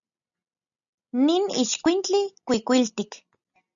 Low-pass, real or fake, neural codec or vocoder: 7.2 kHz; real; none